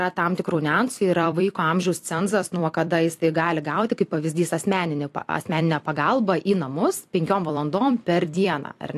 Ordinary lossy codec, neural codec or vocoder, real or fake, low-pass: AAC, 64 kbps; vocoder, 44.1 kHz, 128 mel bands every 256 samples, BigVGAN v2; fake; 14.4 kHz